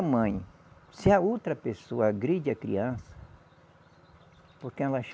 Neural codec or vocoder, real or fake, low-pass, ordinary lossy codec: none; real; none; none